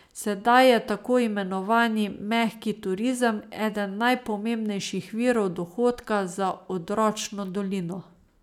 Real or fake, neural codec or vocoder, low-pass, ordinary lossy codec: real; none; 19.8 kHz; none